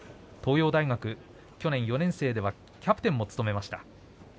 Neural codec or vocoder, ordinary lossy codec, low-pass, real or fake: none; none; none; real